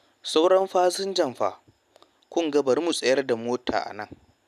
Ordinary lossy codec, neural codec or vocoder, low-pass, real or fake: none; none; 14.4 kHz; real